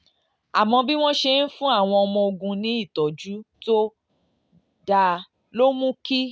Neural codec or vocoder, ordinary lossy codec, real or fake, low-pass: none; none; real; none